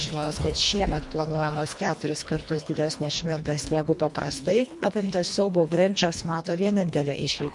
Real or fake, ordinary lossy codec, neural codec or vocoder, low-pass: fake; MP3, 64 kbps; codec, 24 kHz, 1.5 kbps, HILCodec; 10.8 kHz